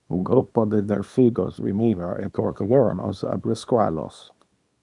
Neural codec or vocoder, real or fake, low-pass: codec, 24 kHz, 0.9 kbps, WavTokenizer, small release; fake; 10.8 kHz